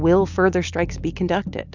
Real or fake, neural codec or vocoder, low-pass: real; none; 7.2 kHz